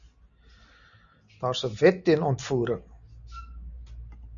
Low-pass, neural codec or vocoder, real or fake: 7.2 kHz; none; real